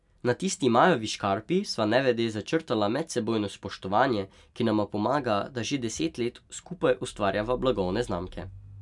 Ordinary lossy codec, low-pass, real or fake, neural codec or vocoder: none; 10.8 kHz; real; none